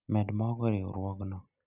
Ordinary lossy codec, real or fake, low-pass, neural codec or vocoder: none; real; 3.6 kHz; none